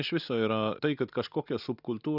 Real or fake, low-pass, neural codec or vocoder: real; 5.4 kHz; none